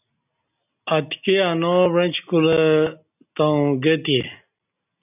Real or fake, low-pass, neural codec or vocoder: real; 3.6 kHz; none